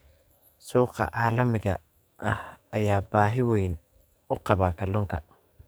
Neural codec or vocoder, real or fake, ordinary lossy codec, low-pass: codec, 44.1 kHz, 2.6 kbps, SNAC; fake; none; none